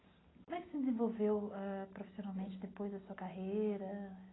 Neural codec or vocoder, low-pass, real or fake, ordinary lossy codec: none; 7.2 kHz; real; AAC, 16 kbps